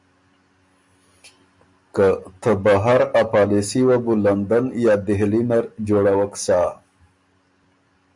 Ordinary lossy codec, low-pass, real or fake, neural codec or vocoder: MP3, 96 kbps; 10.8 kHz; real; none